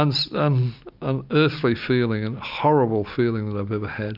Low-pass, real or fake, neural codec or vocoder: 5.4 kHz; real; none